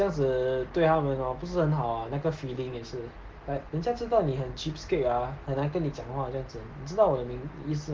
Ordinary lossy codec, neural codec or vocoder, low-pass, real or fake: Opus, 16 kbps; none; 7.2 kHz; real